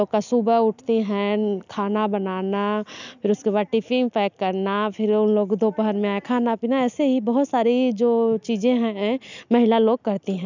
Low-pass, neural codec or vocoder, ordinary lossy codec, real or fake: 7.2 kHz; none; none; real